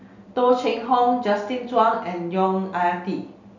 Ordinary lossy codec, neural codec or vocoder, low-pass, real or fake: none; none; 7.2 kHz; real